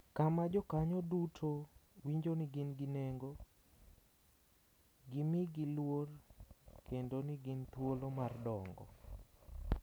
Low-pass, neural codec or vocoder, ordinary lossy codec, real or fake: none; none; none; real